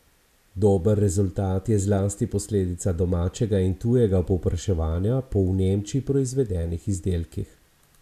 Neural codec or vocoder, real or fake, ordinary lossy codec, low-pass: none; real; none; 14.4 kHz